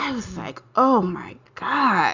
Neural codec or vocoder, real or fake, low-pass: none; real; 7.2 kHz